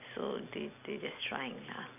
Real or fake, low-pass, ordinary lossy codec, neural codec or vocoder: real; 3.6 kHz; none; none